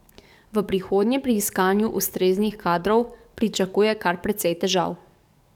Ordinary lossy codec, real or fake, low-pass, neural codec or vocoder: none; fake; 19.8 kHz; codec, 44.1 kHz, 7.8 kbps, DAC